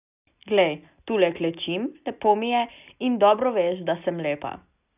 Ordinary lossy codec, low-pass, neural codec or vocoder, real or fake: none; 3.6 kHz; none; real